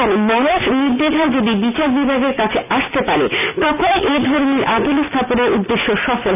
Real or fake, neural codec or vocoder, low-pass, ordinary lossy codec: real; none; 3.6 kHz; none